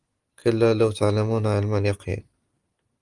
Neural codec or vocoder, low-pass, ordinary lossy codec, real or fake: none; 10.8 kHz; Opus, 24 kbps; real